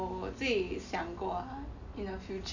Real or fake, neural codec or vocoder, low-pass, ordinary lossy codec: real; none; 7.2 kHz; none